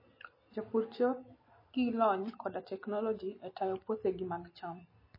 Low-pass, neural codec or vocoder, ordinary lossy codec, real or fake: 5.4 kHz; none; MP3, 24 kbps; real